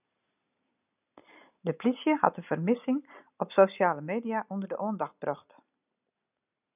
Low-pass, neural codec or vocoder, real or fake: 3.6 kHz; none; real